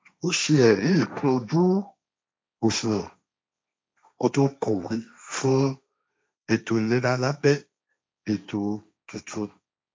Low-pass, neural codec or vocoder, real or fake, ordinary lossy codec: none; codec, 16 kHz, 1.1 kbps, Voila-Tokenizer; fake; none